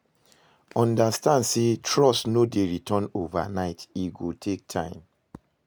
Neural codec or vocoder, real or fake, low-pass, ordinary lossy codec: none; real; none; none